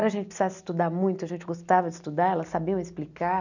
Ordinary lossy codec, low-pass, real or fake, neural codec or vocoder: none; 7.2 kHz; real; none